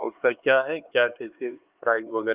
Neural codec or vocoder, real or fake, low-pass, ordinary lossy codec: codec, 16 kHz, 4 kbps, X-Codec, HuBERT features, trained on LibriSpeech; fake; 3.6 kHz; Opus, 24 kbps